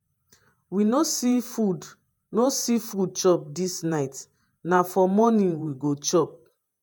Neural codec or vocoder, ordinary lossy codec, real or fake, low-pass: vocoder, 48 kHz, 128 mel bands, Vocos; none; fake; none